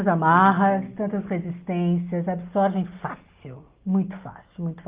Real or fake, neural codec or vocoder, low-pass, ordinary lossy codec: real; none; 3.6 kHz; Opus, 32 kbps